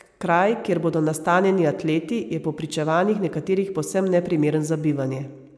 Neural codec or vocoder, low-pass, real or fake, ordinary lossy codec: none; none; real; none